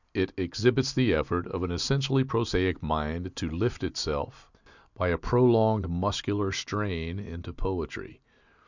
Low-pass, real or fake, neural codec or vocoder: 7.2 kHz; real; none